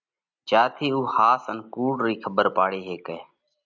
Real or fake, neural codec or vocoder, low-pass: real; none; 7.2 kHz